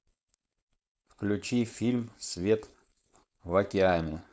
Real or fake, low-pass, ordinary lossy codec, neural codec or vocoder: fake; none; none; codec, 16 kHz, 4.8 kbps, FACodec